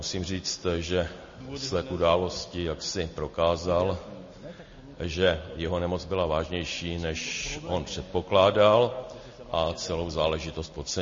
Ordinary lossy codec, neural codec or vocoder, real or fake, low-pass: MP3, 32 kbps; none; real; 7.2 kHz